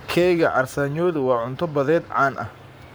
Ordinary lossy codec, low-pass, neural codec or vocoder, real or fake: none; none; codec, 44.1 kHz, 7.8 kbps, Pupu-Codec; fake